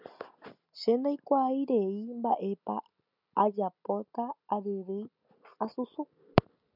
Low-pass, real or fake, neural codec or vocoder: 5.4 kHz; real; none